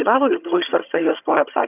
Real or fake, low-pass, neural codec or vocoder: fake; 3.6 kHz; vocoder, 22.05 kHz, 80 mel bands, HiFi-GAN